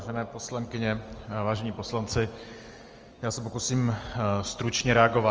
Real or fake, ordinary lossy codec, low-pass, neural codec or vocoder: real; Opus, 24 kbps; 7.2 kHz; none